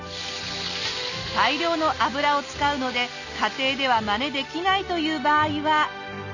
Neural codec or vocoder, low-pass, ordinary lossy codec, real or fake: none; 7.2 kHz; none; real